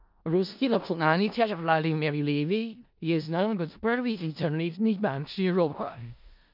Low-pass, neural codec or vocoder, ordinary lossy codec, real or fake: 5.4 kHz; codec, 16 kHz in and 24 kHz out, 0.4 kbps, LongCat-Audio-Codec, four codebook decoder; MP3, 48 kbps; fake